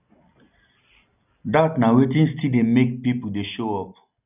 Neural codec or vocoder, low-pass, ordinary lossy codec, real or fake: none; 3.6 kHz; none; real